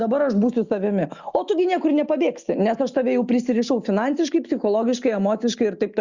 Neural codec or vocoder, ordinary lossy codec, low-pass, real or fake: none; Opus, 64 kbps; 7.2 kHz; real